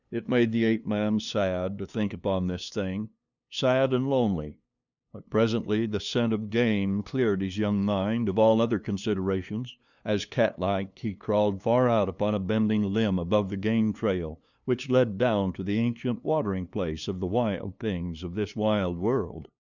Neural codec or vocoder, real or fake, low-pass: codec, 16 kHz, 2 kbps, FunCodec, trained on LibriTTS, 25 frames a second; fake; 7.2 kHz